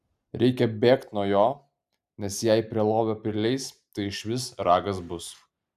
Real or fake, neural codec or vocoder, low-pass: real; none; 14.4 kHz